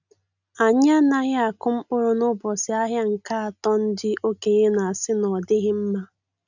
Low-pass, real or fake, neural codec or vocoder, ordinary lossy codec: 7.2 kHz; real; none; none